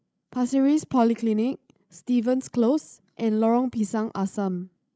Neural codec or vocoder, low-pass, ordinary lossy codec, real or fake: codec, 16 kHz, 16 kbps, FreqCodec, larger model; none; none; fake